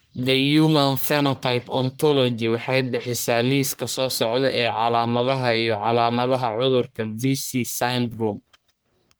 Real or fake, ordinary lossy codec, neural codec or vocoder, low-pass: fake; none; codec, 44.1 kHz, 1.7 kbps, Pupu-Codec; none